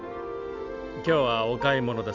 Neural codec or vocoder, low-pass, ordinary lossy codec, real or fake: none; 7.2 kHz; none; real